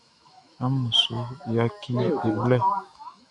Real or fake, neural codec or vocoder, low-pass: fake; autoencoder, 48 kHz, 128 numbers a frame, DAC-VAE, trained on Japanese speech; 10.8 kHz